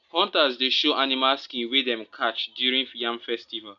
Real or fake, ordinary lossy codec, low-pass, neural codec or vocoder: real; none; 7.2 kHz; none